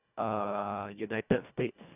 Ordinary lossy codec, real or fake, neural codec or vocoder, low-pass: none; fake; codec, 24 kHz, 1.5 kbps, HILCodec; 3.6 kHz